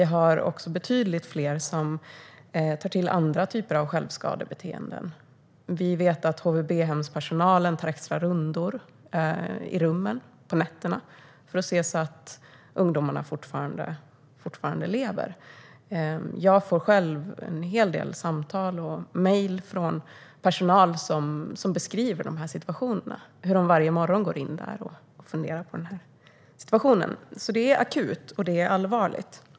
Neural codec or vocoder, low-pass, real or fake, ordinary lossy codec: none; none; real; none